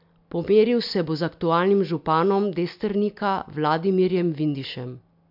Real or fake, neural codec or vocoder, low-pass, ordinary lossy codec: real; none; 5.4 kHz; MP3, 48 kbps